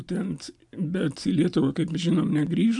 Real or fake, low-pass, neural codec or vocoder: real; 10.8 kHz; none